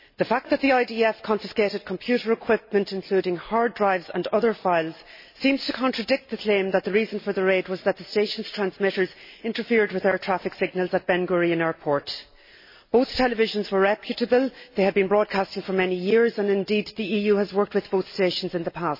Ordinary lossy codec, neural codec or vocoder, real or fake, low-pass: MP3, 24 kbps; none; real; 5.4 kHz